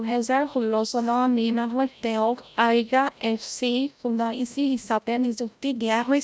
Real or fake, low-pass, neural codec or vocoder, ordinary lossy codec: fake; none; codec, 16 kHz, 0.5 kbps, FreqCodec, larger model; none